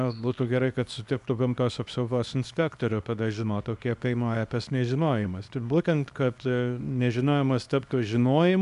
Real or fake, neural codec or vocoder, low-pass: fake; codec, 24 kHz, 0.9 kbps, WavTokenizer, small release; 10.8 kHz